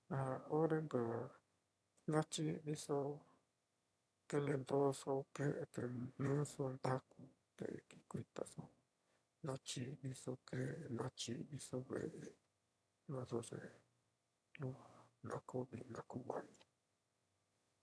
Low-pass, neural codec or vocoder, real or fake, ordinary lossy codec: none; autoencoder, 22.05 kHz, a latent of 192 numbers a frame, VITS, trained on one speaker; fake; none